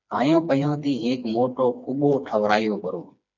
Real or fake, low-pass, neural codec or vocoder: fake; 7.2 kHz; codec, 16 kHz, 2 kbps, FreqCodec, smaller model